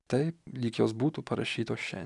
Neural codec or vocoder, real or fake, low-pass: none; real; 10.8 kHz